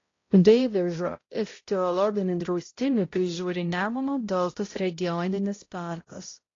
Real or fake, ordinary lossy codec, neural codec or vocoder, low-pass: fake; AAC, 32 kbps; codec, 16 kHz, 0.5 kbps, X-Codec, HuBERT features, trained on balanced general audio; 7.2 kHz